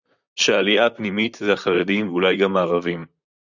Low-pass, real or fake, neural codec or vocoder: 7.2 kHz; fake; vocoder, 44.1 kHz, 128 mel bands, Pupu-Vocoder